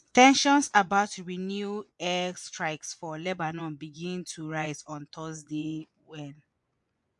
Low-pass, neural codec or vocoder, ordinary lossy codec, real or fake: 10.8 kHz; vocoder, 24 kHz, 100 mel bands, Vocos; MP3, 64 kbps; fake